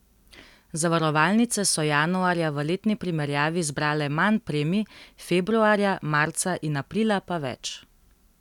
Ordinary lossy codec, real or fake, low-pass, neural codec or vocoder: none; real; 19.8 kHz; none